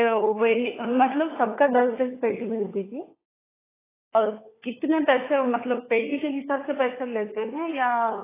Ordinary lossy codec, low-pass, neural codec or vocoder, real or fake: AAC, 16 kbps; 3.6 kHz; codec, 16 kHz, 4 kbps, FunCodec, trained on LibriTTS, 50 frames a second; fake